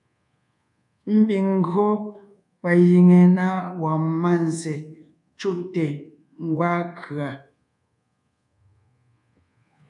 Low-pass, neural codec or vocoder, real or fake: 10.8 kHz; codec, 24 kHz, 1.2 kbps, DualCodec; fake